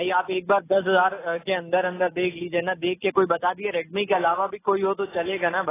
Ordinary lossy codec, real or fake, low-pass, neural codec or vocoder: AAC, 16 kbps; real; 3.6 kHz; none